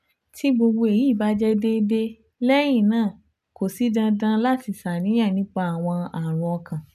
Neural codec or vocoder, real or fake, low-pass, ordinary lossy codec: none; real; 14.4 kHz; none